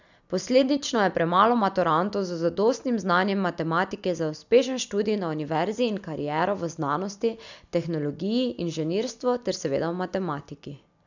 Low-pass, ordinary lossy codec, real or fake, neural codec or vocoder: 7.2 kHz; none; real; none